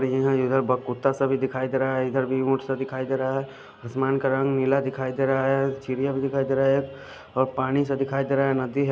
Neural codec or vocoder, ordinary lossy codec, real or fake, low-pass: none; none; real; none